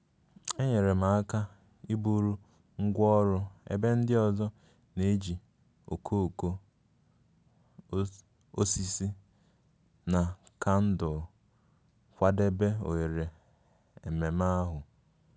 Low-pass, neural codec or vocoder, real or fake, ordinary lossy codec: none; none; real; none